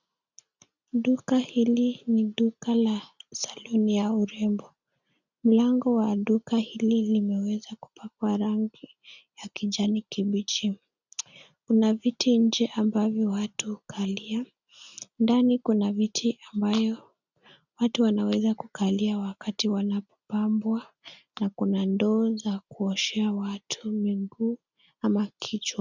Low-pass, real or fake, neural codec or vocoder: 7.2 kHz; real; none